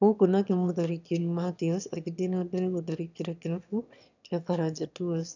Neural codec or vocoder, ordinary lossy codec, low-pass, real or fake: autoencoder, 22.05 kHz, a latent of 192 numbers a frame, VITS, trained on one speaker; none; 7.2 kHz; fake